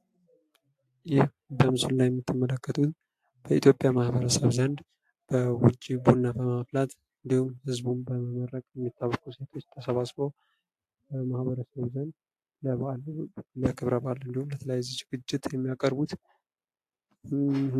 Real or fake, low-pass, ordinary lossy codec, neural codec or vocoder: real; 14.4 kHz; AAC, 64 kbps; none